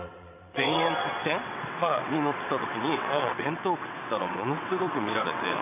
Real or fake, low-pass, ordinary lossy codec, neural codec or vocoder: fake; 3.6 kHz; AAC, 32 kbps; vocoder, 22.05 kHz, 80 mel bands, WaveNeXt